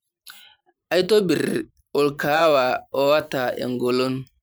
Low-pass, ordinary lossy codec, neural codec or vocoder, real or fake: none; none; vocoder, 44.1 kHz, 128 mel bands every 512 samples, BigVGAN v2; fake